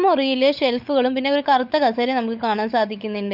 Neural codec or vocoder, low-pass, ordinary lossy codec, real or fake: codec, 16 kHz, 16 kbps, FunCodec, trained on LibriTTS, 50 frames a second; 5.4 kHz; none; fake